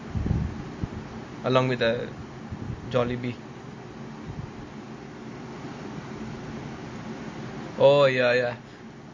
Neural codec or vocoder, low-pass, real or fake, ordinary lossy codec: none; 7.2 kHz; real; MP3, 32 kbps